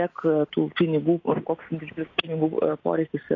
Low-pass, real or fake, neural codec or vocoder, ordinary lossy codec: 7.2 kHz; real; none; AAC, 32 kbps